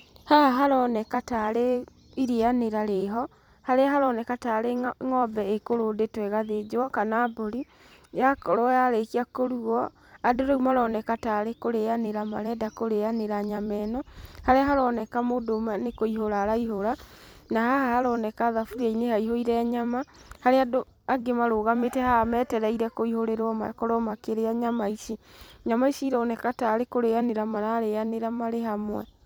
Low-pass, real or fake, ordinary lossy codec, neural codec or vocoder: none; fake; none; vocoder, 44.1 kHz, 128 mel bands every 256 samples, BigVGAN v2